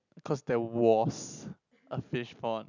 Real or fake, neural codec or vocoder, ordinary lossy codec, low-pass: real; none; none; 7.2 kHz